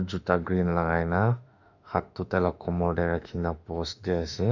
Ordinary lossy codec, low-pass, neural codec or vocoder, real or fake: none; 7.2 kHz; autoencoder, 48 kHz, 32 numbers a frame, DAC-VAE, trained on Japanese speech; fake